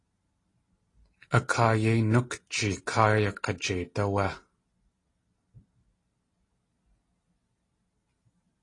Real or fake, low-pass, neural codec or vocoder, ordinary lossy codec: real; 10.8 kHz; none; AAC, 32 kbps